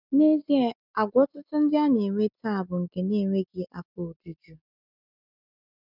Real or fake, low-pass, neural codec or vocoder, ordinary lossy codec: real; 5.4 kHz; none; none